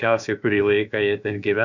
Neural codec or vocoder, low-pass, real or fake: codec, 16 kHz, about 1 kbps, DyCAST, with the encoder's durations; 7.2 kHz; fake